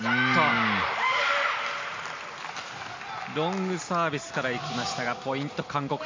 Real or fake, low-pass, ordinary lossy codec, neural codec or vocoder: real; 7.2 kHz; MP3, 48 kbps; none